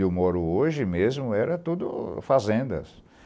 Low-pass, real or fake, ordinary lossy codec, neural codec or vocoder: none; real; none; none